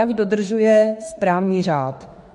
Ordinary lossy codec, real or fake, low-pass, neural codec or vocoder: MP3, 48 kbps; fake; 14.4 kHz; autoencoder, 48 kHz, 32 numbers a frame, DAC-VAE, trained on Japanese speech